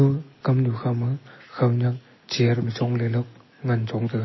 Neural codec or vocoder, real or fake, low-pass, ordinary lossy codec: none; real; 7.2 kHz; MP3, 24 kbps